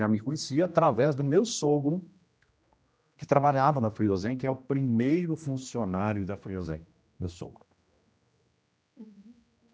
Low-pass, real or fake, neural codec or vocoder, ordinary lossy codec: none; fake; codec, 16 kHz, 1 kbps, X-Codec, HuBERT features, trained on general audio; none